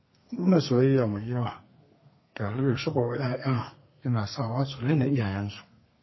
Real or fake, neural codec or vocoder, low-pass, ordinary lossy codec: fake; codec, 32 kHz, 1.9 kbps, SNAC; 7.2 kHz; MP3, 24 kbps